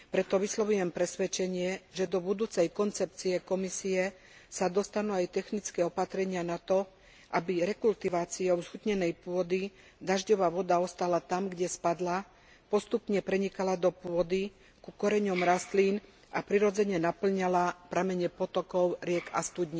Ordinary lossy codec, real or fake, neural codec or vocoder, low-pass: none; real; none; none